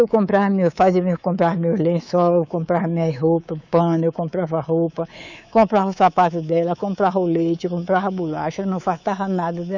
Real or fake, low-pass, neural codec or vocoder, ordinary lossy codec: fake; 7.2 kHz; codec, 24 kHz, 3.1 kbps, DualCodec; none